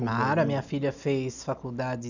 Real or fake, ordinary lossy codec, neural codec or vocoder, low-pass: real; none; none; 7.2 kHz